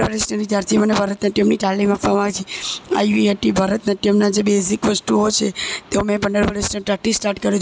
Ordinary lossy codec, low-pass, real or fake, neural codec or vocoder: none; none; real; none